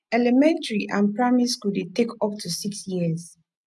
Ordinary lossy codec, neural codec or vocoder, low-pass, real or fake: none; none; none; real